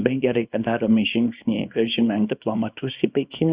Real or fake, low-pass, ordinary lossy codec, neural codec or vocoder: fake; 3.6 kHz; Opus, 64 kbps; codec, 24 kHz, 0.9 kbps, WavTokenizer, small release